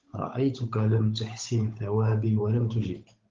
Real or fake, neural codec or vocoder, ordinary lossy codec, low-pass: fake; codec, 16 kHz, 8 kbps, FunCodec, trained on Chinese and English, 25 frames a second; Opus, 32 kbps; 7.2 kHz